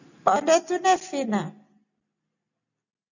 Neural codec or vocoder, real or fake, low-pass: none; real; 7.2 kHz